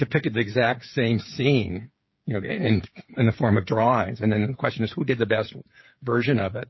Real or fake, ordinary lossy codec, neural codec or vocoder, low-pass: fake; MP3, 24 kbps; codec, 16 kHz, 8 kbps, FreqCodec, smaller model; 7.2 kHz